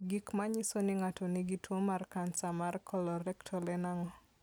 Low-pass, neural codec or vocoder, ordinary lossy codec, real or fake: none; none; none; real